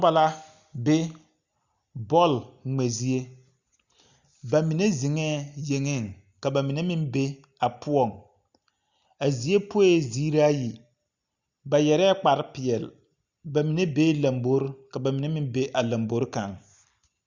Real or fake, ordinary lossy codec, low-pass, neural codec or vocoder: real; Opus, 64 kbps; 7.2 kHz; none